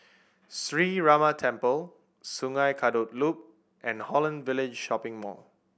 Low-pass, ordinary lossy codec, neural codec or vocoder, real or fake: none; none; none; real